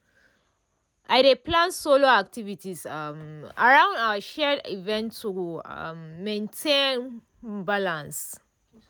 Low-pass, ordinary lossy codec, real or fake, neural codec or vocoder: none; none; real; none